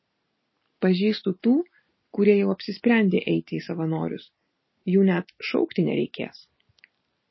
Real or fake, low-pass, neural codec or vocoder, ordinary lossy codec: real; 7.2 kHz; none; MP3, 24 kbps